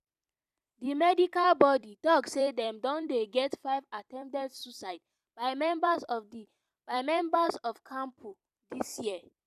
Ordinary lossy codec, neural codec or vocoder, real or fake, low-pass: none; vocoder, 44.1 kHz, 128 mel bands every 256 samples, BigVGAN v2; fake; 14.4 kHz